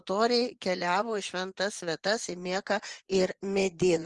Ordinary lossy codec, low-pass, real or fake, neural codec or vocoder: Opus, 24 kbps; 10.8 kHz; fake; vocoder, 24 kHz, 100 mel bands, Vocos